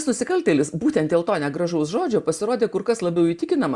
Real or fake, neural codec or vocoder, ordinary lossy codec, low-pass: real; none; Opus, 64 kbps; 10.8 kHz